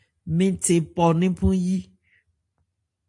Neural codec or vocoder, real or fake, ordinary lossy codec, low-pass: none; real; AAC, 64 kbps; 10.8 kHz